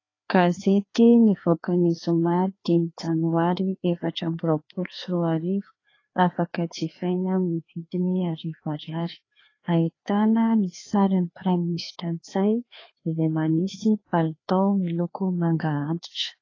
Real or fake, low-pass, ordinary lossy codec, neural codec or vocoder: fake; 7.2 kHz; AAC, 32 kbps; codec, 16 kHz, 2 kbps, FreqCodec, larger model